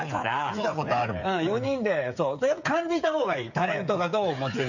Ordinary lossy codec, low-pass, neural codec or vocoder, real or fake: none; 7.2 kHz; codec, 16 kHz, 8 kbps, FreqCodec, smaller model; fake